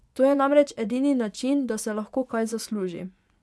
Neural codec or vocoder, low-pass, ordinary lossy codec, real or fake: vocoder, 24 kHz, 100 mel bands, Vocos; none; none; fake